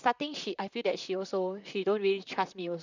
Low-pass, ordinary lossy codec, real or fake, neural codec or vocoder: 7.2 kHz; none; fake; vocoder, 44.1 kHz, 128 mel bands, Pupu-Vocoder